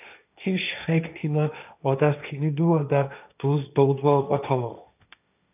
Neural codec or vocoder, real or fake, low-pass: codec, 16 kHz, 1.1 kbps, Voila-Tokenizer; fake; 3.6 kHz